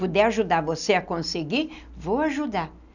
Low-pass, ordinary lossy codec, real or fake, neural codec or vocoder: 7.2 kHz; none; real; none